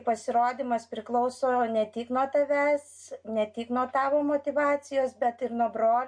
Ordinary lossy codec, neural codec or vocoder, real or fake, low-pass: MP3, 48 kbps; none; real; 9.9 kHz